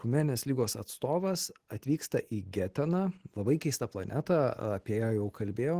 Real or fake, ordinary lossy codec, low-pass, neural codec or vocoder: real; Opus, 16 kbps; 14.4 kHz; none